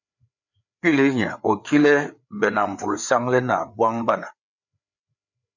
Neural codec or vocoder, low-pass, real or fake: codec, 16 kHz, 4 kbps, FreqCodec, larger model; 7.2 kHz; fake